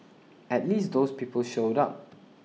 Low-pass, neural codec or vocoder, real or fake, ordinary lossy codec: none; none; real; none